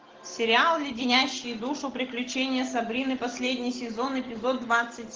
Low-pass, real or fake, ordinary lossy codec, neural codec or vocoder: 7.2 kHz; real; Opus, 16 kbps; none